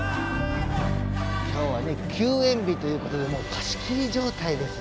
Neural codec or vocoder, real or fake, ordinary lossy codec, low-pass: none; real; none; none